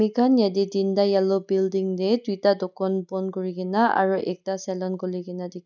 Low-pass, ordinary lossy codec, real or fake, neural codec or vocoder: 7.2 kHz; none; real; none